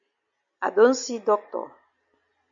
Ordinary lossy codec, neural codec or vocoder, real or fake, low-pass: AAC, 64 kbps; none; real; 7.2 kHz